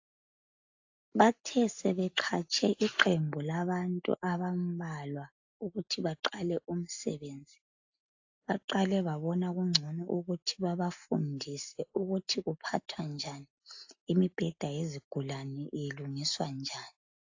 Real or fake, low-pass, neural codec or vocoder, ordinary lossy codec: real; 7.2 kHz; none; AAC, 48 kbps